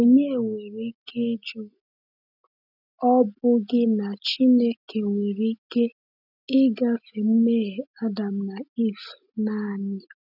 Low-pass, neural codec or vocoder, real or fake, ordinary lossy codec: 5.4 kHz; none; real; none